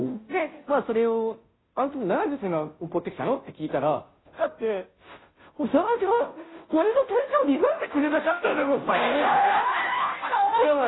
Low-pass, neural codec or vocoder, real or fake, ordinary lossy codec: 7.2 kHz; codec, 16 kHz, 0.5 kbps, FunCodec, trained on Chinese and English, 25 frames a second; fake; AAC, 16 kbps